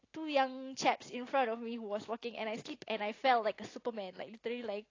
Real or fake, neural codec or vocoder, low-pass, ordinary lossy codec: real; none; 7.2 kHz; AAC, 32 kbps